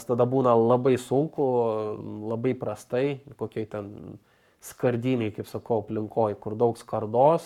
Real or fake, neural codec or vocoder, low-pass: fake; codec, 44.1 kHz, 7.8 kbps, Pupu-Codec; 19.8 kHz